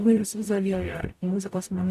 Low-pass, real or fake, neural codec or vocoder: 14.4 kHz; fake; codec, 44.1 kHz, 0.9 kbps, DAC